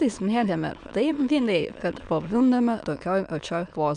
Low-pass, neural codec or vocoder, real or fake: 9.9 kHz; autoencoder, 22.05 kHz, a latent of 192 numbers a frame, VITS, trained on many speakers; fake